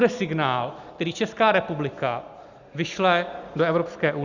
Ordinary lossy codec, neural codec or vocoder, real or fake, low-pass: Opus, 64 kbps; none; real; 7.2 kHz